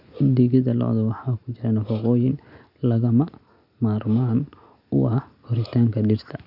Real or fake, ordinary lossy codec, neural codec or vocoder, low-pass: real; none; none; 5.4 kHz